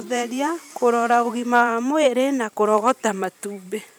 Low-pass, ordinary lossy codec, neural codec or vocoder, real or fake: none; none; vocoder, 44.1 kHz, 128 mel bands every 512 samples, BigVGAN v2; fake